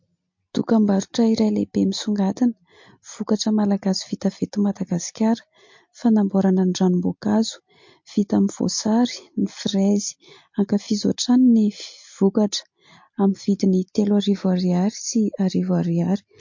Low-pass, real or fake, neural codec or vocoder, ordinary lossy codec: 7.2 kHz; real; none; MP3, 48 kbps